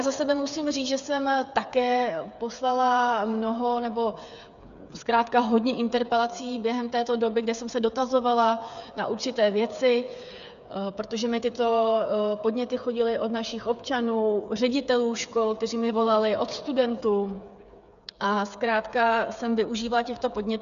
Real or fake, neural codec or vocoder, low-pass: fake; codec, 16 kHz, 8 kbps, FreqCodec, smaller model; 7.2 kHz